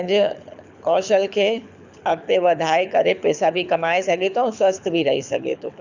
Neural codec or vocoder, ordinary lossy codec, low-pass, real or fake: codec, 24 kHz, 6 kbps, HILCodec; none; 7.2 kHz; fake